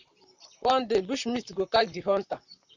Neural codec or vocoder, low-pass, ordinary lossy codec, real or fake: vocoder, 44.1 kHz, 128 mel bands, Pupu-Vocoder; 7.2 kHz; Opus, 64 kbps; fake